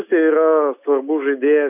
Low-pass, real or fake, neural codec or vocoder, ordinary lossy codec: 3.6 kHz; real; none; AAC, 32 kbps